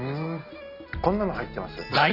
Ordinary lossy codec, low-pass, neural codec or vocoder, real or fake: none; 5.4 kHz; none; real